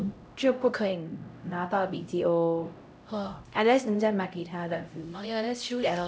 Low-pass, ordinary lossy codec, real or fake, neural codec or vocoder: none; none; fake; codec, 16 kHz, 0.5 kbps, X-Codec, HuBERT features, trained on LibriSpeech